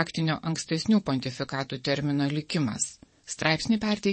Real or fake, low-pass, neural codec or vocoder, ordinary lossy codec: fake; 9.9 kHz; vocoder, 22.05 kHz, 80 mel bands, WaveNeXt; MP3, 32 kbps